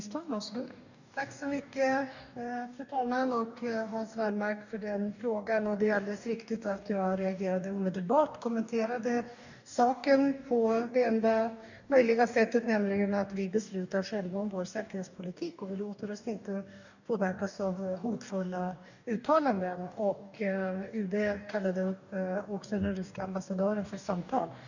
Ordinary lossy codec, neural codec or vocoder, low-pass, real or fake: none; codec, 44.1 kHz, 2.6 kbps, DAC; 7.2 kHz; fake